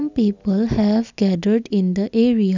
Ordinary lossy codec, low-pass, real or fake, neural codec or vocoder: none; 7.2 kHz; real; none